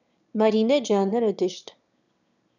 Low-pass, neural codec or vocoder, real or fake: 7.2 kHz; autoencoder, 22.05 kHz, a latent of 192 numbers a frame, VITS, trained on one speaker; fake